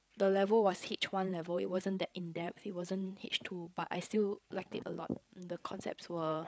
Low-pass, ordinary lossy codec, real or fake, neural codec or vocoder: none; none; fake; codec, 16 kHz, 8 kbps, FreqCodec, larger model